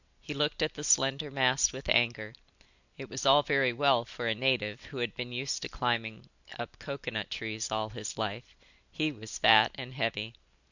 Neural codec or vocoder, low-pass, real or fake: none; 7.2 kHz; real